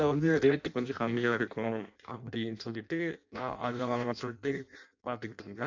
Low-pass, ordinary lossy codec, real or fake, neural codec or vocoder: 7.2 kHz; MP3, 64 kbps; fake; codec, 16 kHz in and 24 kHz out, 0.6 kbps, FireRedTTS-2 codec